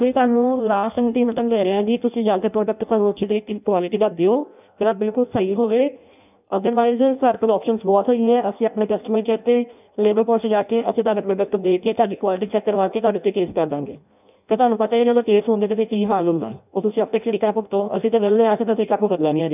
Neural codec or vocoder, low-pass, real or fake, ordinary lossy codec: codec, 16 kHz in and 24 kHz out, 0.6 kbps, FireRedTTS-2 codec; 3.6 kHz; fake; none